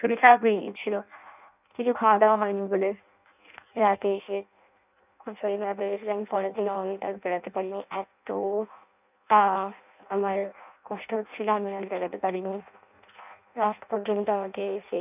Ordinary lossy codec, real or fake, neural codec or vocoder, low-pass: none; fake; codec, 16 kHz in and 24 kHz out, 0.6 kbps, FireRedTTS-2 codec; 3.6 kHz